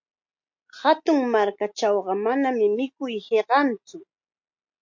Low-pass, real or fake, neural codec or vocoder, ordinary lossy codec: 7.2 kHz; real; none; MP3, 48 kbps